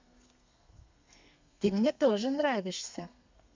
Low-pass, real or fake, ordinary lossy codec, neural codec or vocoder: 7.2 kHz; fake; MP3, 64 kbps; codec, 32 kHz, 1.9 kbps, SNAC